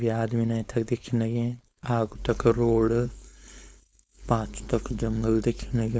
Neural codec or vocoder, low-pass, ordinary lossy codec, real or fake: codec, 16 kHz, 4.8 kbps, FACodec; none; none; fake